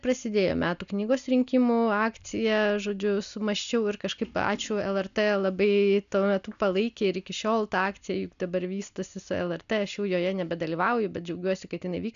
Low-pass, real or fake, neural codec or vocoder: 7.2 kHz; real; none